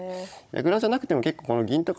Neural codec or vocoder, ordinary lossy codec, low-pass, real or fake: codec, 16 kHz, 16 kbps, FunCodec, trained on Chinese and English, 50 frames a second; none; none; fake